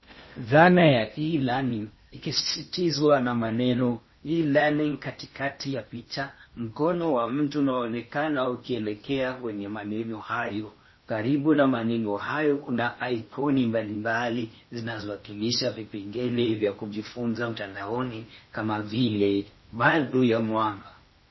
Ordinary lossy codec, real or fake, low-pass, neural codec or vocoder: MP3, 24 kbps; fake; 7.2 kHz; codec, 16 kHz in and 24 kHz out, 0.8 kbps, FocalCodec, streaming, 65536 codes